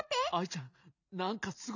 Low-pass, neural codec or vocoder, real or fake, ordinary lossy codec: 7.2 kHz; none; real; none